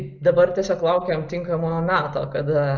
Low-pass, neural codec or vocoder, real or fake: 7.2 kHz; none; real